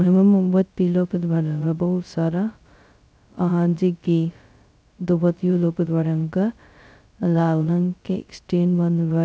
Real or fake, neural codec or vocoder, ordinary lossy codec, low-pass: fake; codec, 16 kHz, 0.2 kbps, FocalCodec; none; none